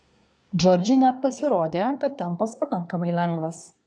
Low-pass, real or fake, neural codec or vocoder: 9.9 kHz; fake; codec, 24 kHz, 1 kbps, SNAC